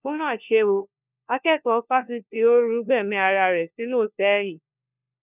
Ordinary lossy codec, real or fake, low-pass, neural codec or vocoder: none; fake; 3.6 kHz; codec, 16 kHz, 1 kbps, FunCodec, trained on LibriTTS, 50 frames a second